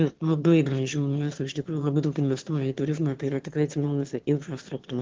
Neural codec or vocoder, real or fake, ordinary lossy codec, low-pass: autoencoder, 22.05 kHz, a latent of 192 numbers a frame, VITS, trained on one speaker; fake; Opus, 16 kbps; 7.2 kHz